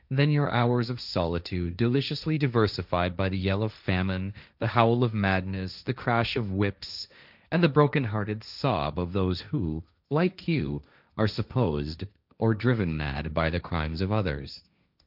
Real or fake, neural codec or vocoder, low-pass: fake; codec, 16 kHz, 1.1 kbps, Voila-Tokenizer; 5.4 kHz